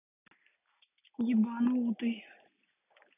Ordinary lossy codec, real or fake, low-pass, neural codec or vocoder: none; real; 3.6 kHz; none